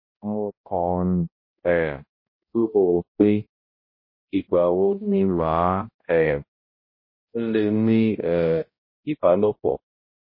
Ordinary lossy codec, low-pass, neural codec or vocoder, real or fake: MP3, 24 kbps; 5.4 kHz; codec, 16 kHz, 0.5 kbps, X-Codec, HuBERT features, trained on balanced general audio; fake